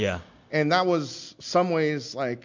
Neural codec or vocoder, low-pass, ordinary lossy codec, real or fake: none; 7.2 kHz; MP3, 64 kbps; real